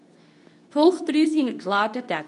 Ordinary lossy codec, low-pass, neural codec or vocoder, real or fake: none; 10.8 kHz; codec, 24 kHz, 0.9 kbps, WavTokenizer, medium speech release version 2; fake